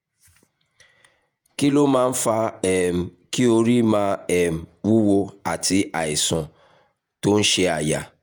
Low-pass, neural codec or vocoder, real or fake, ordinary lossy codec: none; vocoder, 48 kHz, 128 mel bands, Vocos; fake; none